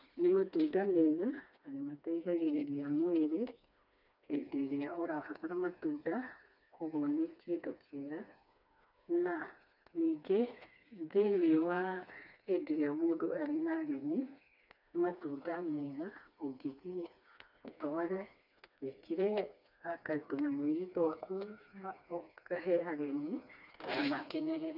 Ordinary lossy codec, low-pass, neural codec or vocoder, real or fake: none; 5.4 kHz; codec, 16 kHz, 2 kbps, FreqCodec, smaller model; fake